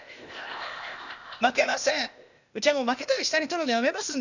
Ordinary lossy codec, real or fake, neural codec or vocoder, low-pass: none; fake; codec, 16 kHz, 0.8 kbps, ZipCodec; 7.2 kHz